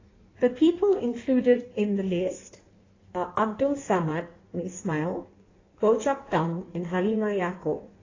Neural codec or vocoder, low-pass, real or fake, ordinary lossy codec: codec, 16 kHz in and 24 kHz out, 1.1 kbps, FireRedTTS-2 codec; 7.2 kHz; fake; AAC, 32 kbps